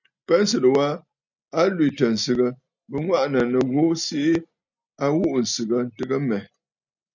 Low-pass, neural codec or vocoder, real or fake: 7.2 kHz; none; real